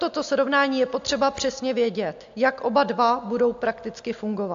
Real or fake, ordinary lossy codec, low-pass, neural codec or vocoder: real; AAC, 64 kbps; 7.2 kHz; none